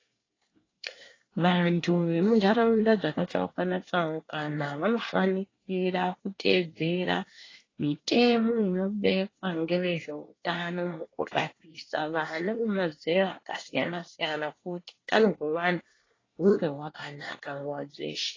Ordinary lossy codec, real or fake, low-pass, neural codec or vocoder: AAC, 32 kbps; fake; 7.2 kHz; codec, 24 kHz, 1 kbps, SNAC